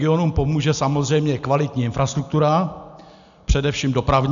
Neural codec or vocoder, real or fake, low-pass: none; real; 7.2 kHz